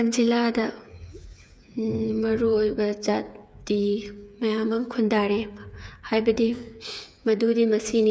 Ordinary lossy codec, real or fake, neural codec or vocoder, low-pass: none; fake; codec, 16 kHz, 8 kbps, FreqCodec, smaller model; none